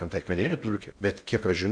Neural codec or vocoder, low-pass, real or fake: codec, 16 kHz in and 24 kHz out, 0.6 kbps, FocalCodec, streaming, 2048 codes; 9.9 kHz; fake